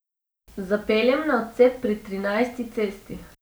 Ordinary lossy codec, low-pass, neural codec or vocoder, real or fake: none; none; none; real